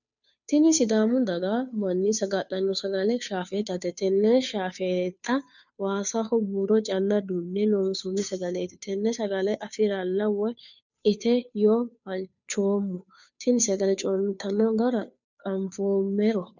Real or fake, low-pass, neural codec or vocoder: fake; 7.2 kHz; codec, 16 kHz, 2 kbps, FunCodec, trained on Chinese and English, 25 frames a second